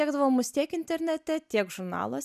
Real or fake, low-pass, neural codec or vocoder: real; 14.4 kHz; none